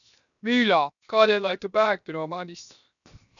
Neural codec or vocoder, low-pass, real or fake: codec, 16 kHz, 0.7 kbps, FocalCodec; 7.2 kHz; fake